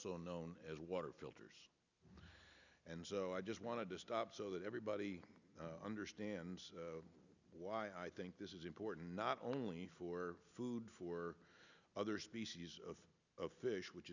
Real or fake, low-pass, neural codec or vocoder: real; 7.2 kHz; none